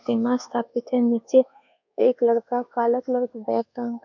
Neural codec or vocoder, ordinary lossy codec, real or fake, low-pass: codec, 24 kHz, 1.2 kbps, DualCodec; none; fake; 7.2 kHz